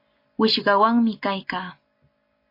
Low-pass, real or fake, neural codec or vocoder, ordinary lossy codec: 5.4 kHz; real; none; MP3, 32 kbps